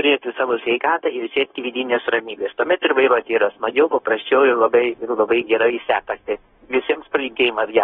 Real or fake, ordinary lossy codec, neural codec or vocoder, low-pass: fake; AAC, 16 kbps; codec, 16 kHz, 0.9 kbps, LongCat-Audio-Codec; 7.2 kHz